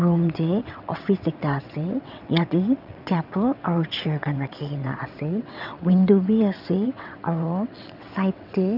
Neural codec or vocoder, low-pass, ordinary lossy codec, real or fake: vocoder, 22.05 kHz, 80 mel bands, WaveNeXt; 5.4 kHz; none; fake